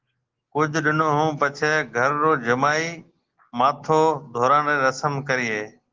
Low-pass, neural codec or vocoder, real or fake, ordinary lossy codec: 7.2 kHz; none; real; Opus, 16 kbps